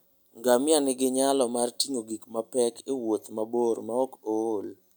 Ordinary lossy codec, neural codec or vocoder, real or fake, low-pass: none; none; real; none